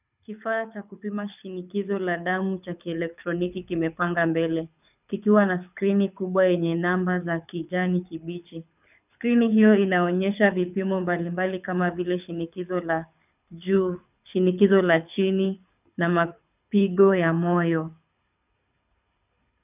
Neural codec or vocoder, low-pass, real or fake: codec, 24 kHz, 6 kbps, HILCodec; 3.6 kHz; fake